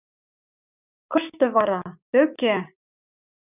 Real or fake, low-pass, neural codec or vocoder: fake; 3.6 kHz; codec, 16 kHz, 6 kbps, DAC